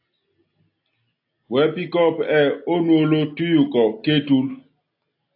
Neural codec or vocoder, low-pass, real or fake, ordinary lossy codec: none; 5.4 kHz; real; AAC, 32 kbps